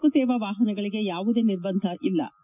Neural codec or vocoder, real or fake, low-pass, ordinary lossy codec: none; real; 3.6 kHz; none